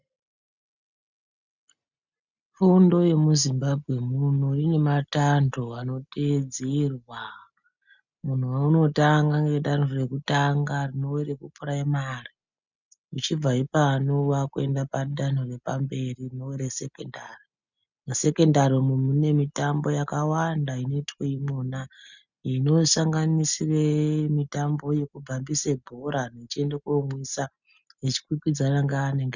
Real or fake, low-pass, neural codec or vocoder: real; 7.2 kHz; none